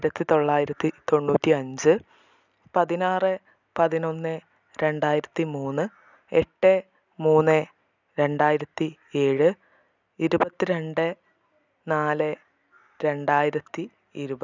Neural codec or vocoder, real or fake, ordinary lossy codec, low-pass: none; real; none; 7.2 kHz